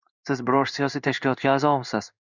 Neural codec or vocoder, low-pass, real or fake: codec, 16 kHz in and 24 kHz out, 1 kbps, XY-Tokenizer; 7.2 kHz; fake